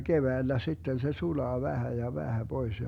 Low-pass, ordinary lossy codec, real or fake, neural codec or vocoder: 19.8 kHz; none; real; none